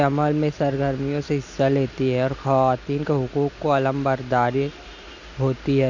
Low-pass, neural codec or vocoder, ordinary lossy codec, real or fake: 7.2 kHz; none; none; real